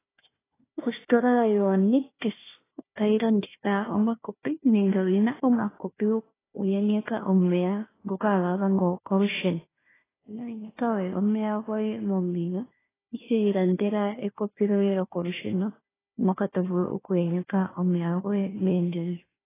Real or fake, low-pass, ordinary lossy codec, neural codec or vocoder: fake; 3.6 kHz; AAC, 16 kbps; codec, 16 kHz, 1 kbps, FunCodec, trained on Chinese and English, 50 frames a second